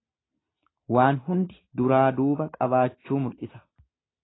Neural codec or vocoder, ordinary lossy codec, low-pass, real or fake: none; AAC, 16 kbps; 7.2 kHz; real